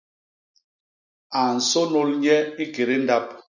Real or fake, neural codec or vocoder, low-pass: real; none; 7.2 kHz